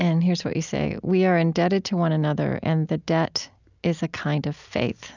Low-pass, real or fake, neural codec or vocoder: 7.2 kHz; real; none